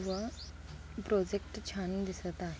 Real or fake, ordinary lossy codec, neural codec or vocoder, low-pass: real; none; none; none